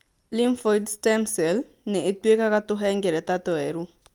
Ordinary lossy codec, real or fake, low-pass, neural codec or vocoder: Opus, 32 kbps; real; 19.8 kHz; none